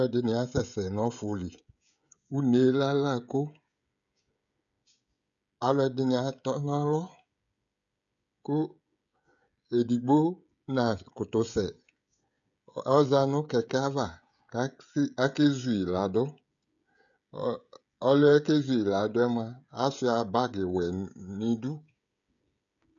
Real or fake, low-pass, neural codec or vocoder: fake; 7.2 kHz; codec, 16 kHz, 16 kbps, FreqCodec, smaller model